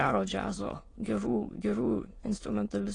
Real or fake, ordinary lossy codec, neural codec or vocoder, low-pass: fake; AAC, 32 kbps; autoencoder, 22.05 kHz, a latent of 192 numbers a frame, VITS, trained on many speakers; 9.9 kHz